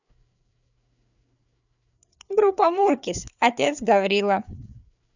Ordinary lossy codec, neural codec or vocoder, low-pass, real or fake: none; codec, 16 kHz, 4 kbps, FreqCodec, larger model; 7.2 kHz; fake